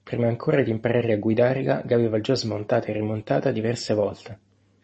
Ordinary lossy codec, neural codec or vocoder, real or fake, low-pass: MP3, 32 kbps; none; real; 10.8 kHz